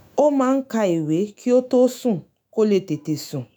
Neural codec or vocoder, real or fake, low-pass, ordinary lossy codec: autoencoder, 48 kHz, 128 numbers a frame, DAC-VAE, trained on Japanese speech; fake; none; none